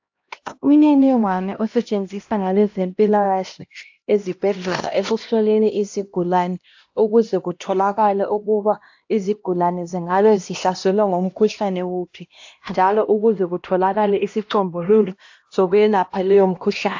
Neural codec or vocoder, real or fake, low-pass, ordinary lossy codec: codec, 16 kHz, 1 kbps, X-Codec, HuBERT features, trained on LibriSpeech; fake; 7.2 kHz; AAC, 48 kbps